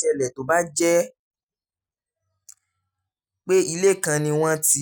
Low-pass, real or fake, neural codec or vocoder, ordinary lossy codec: none; real; none; none